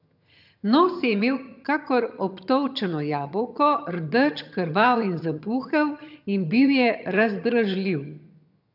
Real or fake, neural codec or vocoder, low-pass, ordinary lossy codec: fake; vocoder, 22.05 kHz, 80 mel bands, HiFi-GAN; 5.4 kHz; none